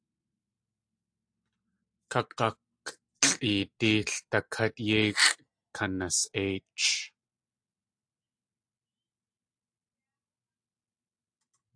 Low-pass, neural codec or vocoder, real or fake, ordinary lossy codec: 9.9 kHz; none; real; AAC, 64 kbps